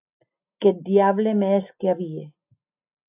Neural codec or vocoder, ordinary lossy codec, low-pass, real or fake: none; AAC, 24 kbps; 3.6 kHz; real